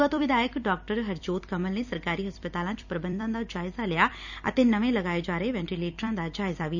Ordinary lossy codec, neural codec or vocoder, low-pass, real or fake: none; vocoder, 44.1 kHz, 128 mel bands every 256 samples, BigVGAN v2; 7.2 kHz; fake